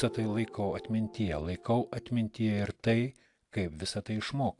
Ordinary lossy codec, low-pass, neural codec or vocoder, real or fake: AAC, 64 kbps; 10.8 kHz; none; real